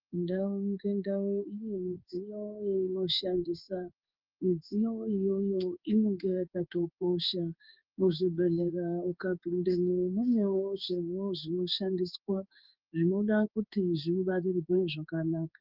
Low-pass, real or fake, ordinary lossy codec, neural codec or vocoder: 5.4 kHz; fake; Opus, 24 kbps; codec, 16 kHz in and 24 kHz out, 1 kbps, XY-Tokenizer